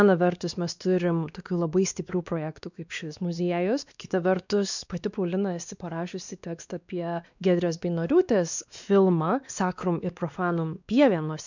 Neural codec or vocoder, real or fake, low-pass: codec, 16 kHz, 2 kbps, X-Codec, WavLM features, trained on Multilingual LibriSpeech; fake; 7.2 kHz